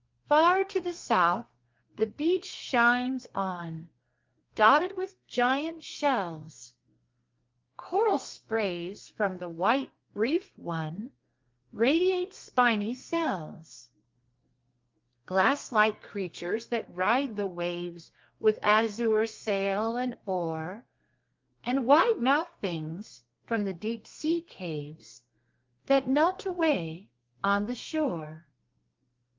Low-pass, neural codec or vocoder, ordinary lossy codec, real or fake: 7.2 kHz; codec, 32 kHz, 1.9 kbps, SNAC; Opus, 32 kbps; fake